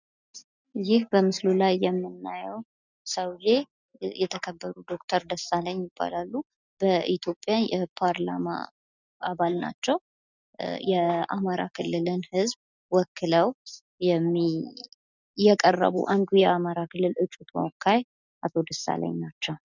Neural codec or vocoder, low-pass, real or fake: none; 7.2 kHz; real